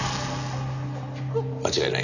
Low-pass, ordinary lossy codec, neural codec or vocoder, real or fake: 7.2 kHz; none; none; real